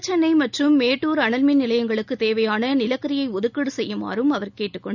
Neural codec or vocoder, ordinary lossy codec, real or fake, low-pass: none; none; real; 7.2 kHz